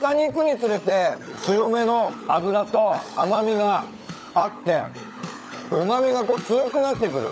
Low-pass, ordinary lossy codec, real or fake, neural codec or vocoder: none; none; fake; codec, 16 kHz, 16 kbps, FunCodec, trained on LibriTTS, 50 frames a second